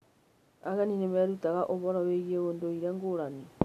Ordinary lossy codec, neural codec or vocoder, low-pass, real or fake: MP3, 96 kbps; none; 14.4 kHz; real